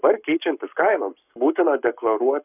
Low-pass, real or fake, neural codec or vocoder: 3.6 kHz; fake; codec, 44.1 kHz, 7.8 kbps, Pupu-Codec